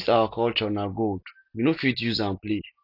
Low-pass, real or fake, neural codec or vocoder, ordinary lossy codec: 5.4 kHz; real; none; none